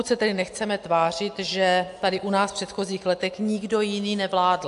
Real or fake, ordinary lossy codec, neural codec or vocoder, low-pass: real; AAC, 64 kbps; none; 10.8 kHz